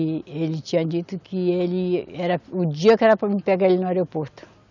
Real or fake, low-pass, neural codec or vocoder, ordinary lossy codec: real; 7.2 kHz; none; none